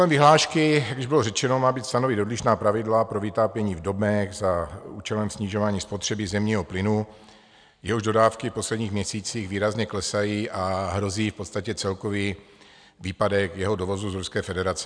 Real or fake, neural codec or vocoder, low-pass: real; none; 9.9 kHz